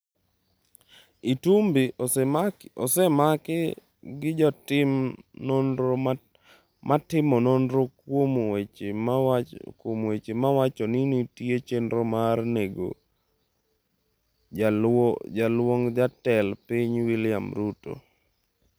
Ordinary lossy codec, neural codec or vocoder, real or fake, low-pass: none; none; real; none